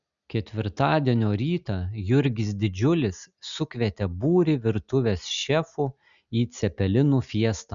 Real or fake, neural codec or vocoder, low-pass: real; none; 7.2 kHz